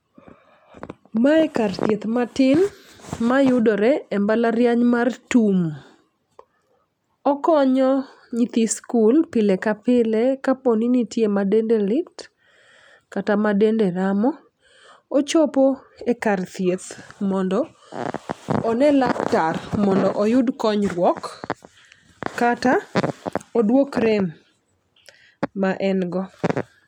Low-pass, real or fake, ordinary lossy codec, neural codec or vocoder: 19.8 kHz; real; none; none